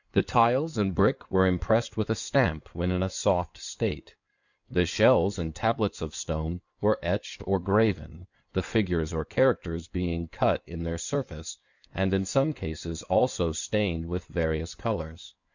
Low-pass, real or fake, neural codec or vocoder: 7.2 kHz; fake; codec, 16 kHz in and 24 kHz out, 2.2 kbps, FireRedTTS-2 codec